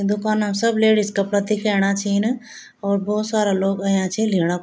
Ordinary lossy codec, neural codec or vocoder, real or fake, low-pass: none; none; real; none